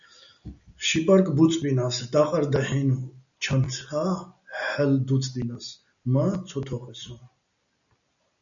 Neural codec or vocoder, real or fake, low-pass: none; real; 7.2 kHz